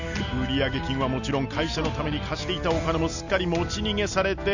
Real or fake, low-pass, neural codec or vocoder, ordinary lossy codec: real; 7.2 kHz; none; none